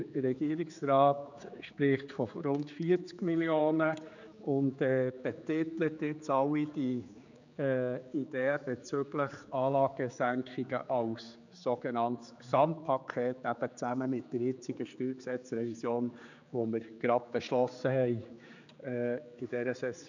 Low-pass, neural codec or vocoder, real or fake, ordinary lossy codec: 7.2 kHz; codec, 16 kHz, 4 kbps, X-Codec, HuBERT features, trained on general audio; fake; none